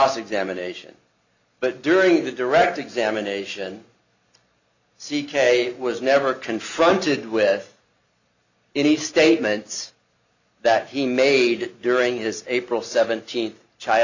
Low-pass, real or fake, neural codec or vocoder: 7.2 kHz; real; none